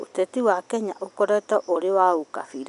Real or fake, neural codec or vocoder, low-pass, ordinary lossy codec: real; none; 10.8 kHz; none